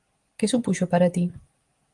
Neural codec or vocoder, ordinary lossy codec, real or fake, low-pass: none; Opus, 24 kbps; real; 10.8 kHz